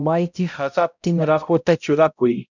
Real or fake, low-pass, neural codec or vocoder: fake; 7.2 kHz; codec, 16 kHz, 0.5 kbps, X-Codec, HuBERT features, trained on balanced general audio